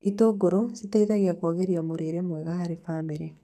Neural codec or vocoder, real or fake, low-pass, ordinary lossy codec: codec, 44.1 kHz, 2.6 kbps, SNAC; fake; 14.4 kHz; none